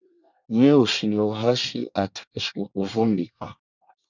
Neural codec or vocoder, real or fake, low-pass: codec, 24 kHz, 1 kbps, SNAC; fake; 7.2 kHz